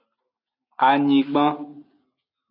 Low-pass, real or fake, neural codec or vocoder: 5.4 kHz; real; none